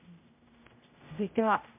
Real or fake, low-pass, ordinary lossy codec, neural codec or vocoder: fake; 3.6 kHz; MP3, 24 kbps; codec, 16 kHz, 0.5 kbps, X-Codec, HuBERT features, trained on general audio